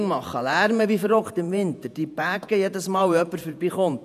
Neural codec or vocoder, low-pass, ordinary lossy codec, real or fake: vocoder, 48 kHz, 128 mel bands, Vocos; 14.4 kHz; none; fake